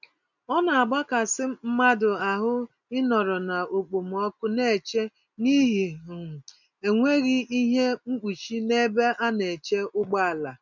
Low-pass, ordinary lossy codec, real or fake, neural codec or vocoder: 7.2 kHz; none; real; none